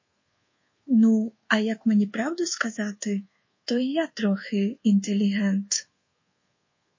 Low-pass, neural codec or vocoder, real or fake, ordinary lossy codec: 7.2 kHz; codec, 24 kHz, 1.2 kbps, DualCodec; fake; MP3, 32 kbps